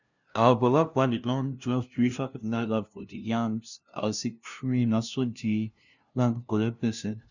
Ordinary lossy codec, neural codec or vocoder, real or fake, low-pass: none; codec, 16 kHz, 0.5 kbps, FunCodec, trained on LibriTTS, 25 frames a second; fake; 7.2 kHz